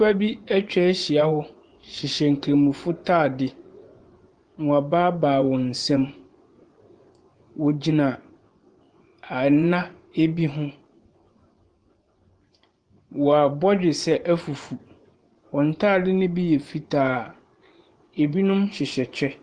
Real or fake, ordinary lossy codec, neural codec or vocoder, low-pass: fake; Opus, 24 kbps; vocoder, 24 kHz, 100 mel bands, Vocos; 9.9 kHz